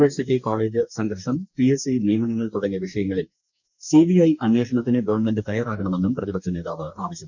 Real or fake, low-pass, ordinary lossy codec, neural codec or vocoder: fake; 7.2 kHz; none; codec, 44.1 kHz, 2.6 kbps, DAC